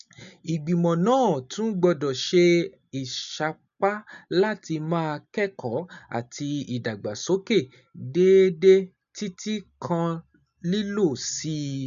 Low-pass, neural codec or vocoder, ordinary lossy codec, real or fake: 7.2 kHz; none; none; real